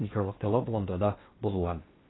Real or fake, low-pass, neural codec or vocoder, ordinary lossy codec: fake; 7.2 kHz; codec, 16 kHz in and 24 kHz out, 0.6 kbps, FocalCodec, streaming, 2048 codes; AAC, 16 kbps